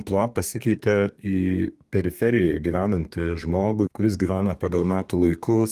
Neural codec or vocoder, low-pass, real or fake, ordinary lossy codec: codec, 32 kHz, 1.9 kbps, SNAC; 14.4 kHz; fake; Opus, 32 kbps